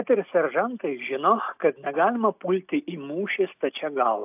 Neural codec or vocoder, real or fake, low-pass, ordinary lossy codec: none; real; 3.6 kHz; AAC, 32 kbps